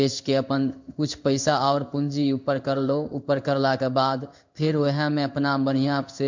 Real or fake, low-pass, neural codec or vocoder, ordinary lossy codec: fake; 7.2 kHz; codec, 16 kHz in and 24 kHz out, 1 kbps, XY-Tokenizer; MP3, 64 kbps